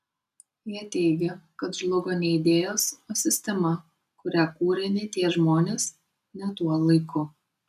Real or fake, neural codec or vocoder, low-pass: real; none; 14.4 kHz